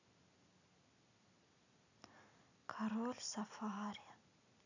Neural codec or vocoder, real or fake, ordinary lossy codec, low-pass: none; real; none; 7.2 kHz